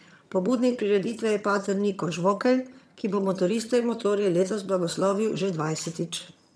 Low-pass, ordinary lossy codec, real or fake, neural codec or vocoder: none; none; fake; vocoder, 22.05 kHz, 80 mel bands, HiFi-GAN